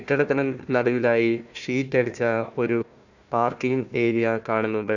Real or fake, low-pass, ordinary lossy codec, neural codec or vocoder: fake; 7.2 kHz; none; codec, 16 kHz, 1 kbps, FunCodec, trained on Chinese and English, 50 frames a second